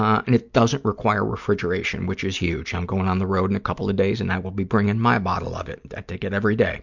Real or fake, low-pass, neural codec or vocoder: real; 7.2 kHz; none